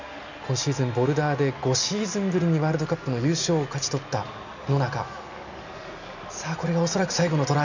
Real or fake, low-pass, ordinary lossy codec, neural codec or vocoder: real; 7.2 kHz; none; none